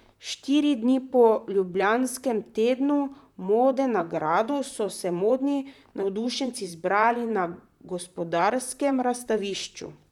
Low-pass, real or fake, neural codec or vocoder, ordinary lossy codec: 19.8 kHz; fake; vocoder, 44.1 kHz, 128 mel bands, Pupu-Vocoder; none